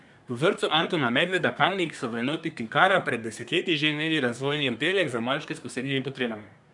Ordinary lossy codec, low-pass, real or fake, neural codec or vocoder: none; 10.8 kHz; fake; codec, 24 kHz, 1 kbps, SNAC